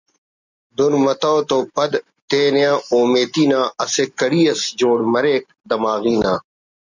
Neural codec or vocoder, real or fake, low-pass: none; real; 7.2 kHz